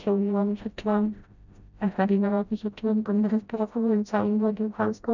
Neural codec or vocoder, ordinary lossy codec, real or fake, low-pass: codec, 16 kHz, 0.5 kbps, FreqCodec, smaller model; none; fake; 7.2 kHz